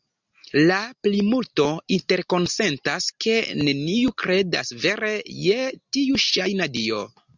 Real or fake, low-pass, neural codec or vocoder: real; 7.2 kHz; none